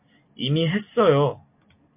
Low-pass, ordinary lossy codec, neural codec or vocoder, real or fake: 3.6 kHz; MP3, 32 kbps; none; real